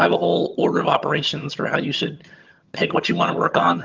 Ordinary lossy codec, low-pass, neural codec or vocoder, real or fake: Opus, 32 kbps; 7.2 kHz; vocoder, 22.05 kHz, 80 mel bands, HiFi-GAN; fake